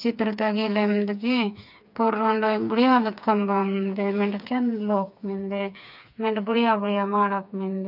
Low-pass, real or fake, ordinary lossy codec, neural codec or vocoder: 5.4 kHz; fake; none; codec, 16 kHz, 4 kbps, FreqCodec, smaller model